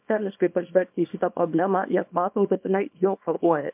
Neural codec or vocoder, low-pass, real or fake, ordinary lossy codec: codec, 24 kHz, 0.9 kbps, WavTokenizer, small release; 3.6 kHz; fake; MP3, 32 kbps